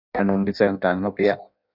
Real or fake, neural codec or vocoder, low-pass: fake; codec, 16 kHz in and 24 kHz out, 0.6 kbps, FireRedTTS-2 codec; 5.4 kHz